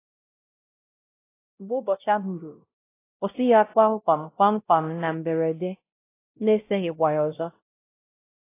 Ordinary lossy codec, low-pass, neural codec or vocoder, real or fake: AAC, 24 kbps; 3.6 kHz; codec, 16 kHz, 0.5 kbps, X-Codec, WavLM features, trained on Multilingual LibriSpeech; fake